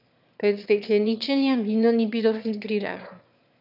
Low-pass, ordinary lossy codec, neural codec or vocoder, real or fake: 5.4 kHz; none; autoencoder, 22.05 kHz, a latent of 192 numbers a frame, VITS, trained on one speaker; fake